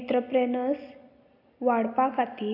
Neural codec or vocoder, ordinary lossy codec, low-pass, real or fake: none; AAC, 32 kbps; 5.4 kHz; real